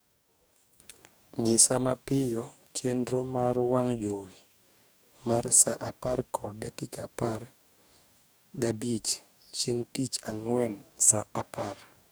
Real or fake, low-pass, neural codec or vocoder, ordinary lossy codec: fake; none; codec, 44.1 kHz, 2.6 kbps, DAC; none